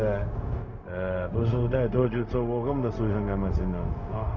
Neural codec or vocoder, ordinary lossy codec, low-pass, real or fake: codec, 16 kHz, 0.4 kbps, LongCat-Audio-Codec; none; 7.2 kHz; fake